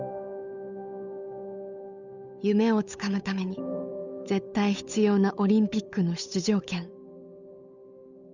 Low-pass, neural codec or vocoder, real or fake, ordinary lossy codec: 7.2 kHz; codec, 16 kHz, 8 kbps, FunCodec, trained on Chinese and English, 25 frames a second; fake; none